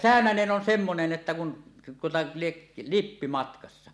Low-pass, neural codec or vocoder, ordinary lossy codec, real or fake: none; none; none; real